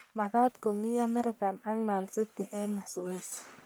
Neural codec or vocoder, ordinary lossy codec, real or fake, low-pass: codec, 44.1 kHz, 1.7 kbps, Pupu-Codec; none; fake; none